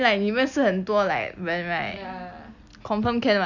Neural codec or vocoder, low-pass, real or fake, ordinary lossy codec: none; 7.2 kHz; real; none